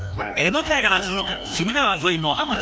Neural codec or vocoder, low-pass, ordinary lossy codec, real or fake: codec, 16 kHz, 1 kbps, FreqCodec, larger model; none; none; fake